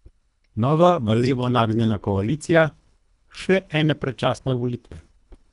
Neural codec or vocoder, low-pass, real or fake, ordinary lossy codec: codec, 24 kHz, 1.5 kbps, HILCodec; 10.8 kHz; fake; none